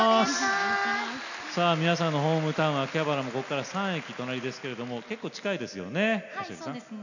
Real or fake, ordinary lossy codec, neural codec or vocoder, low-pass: real; none; none; 7.2 kHz